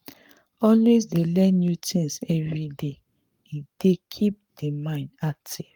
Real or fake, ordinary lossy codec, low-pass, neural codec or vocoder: fake; Opus, 32 kbps; 19.8 kHz; codec, 44.1 kHz, 7.8 kbps, Pupu-Codec